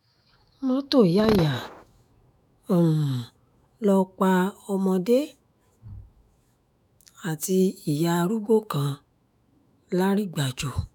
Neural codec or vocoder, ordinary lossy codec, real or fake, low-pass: autoencoder, 48 kHz, 128 numbers a frame, DAC-VAE, trained on Japanese speech; none; fake; none